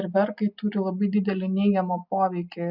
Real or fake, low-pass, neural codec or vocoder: real; 5.4 kHz; none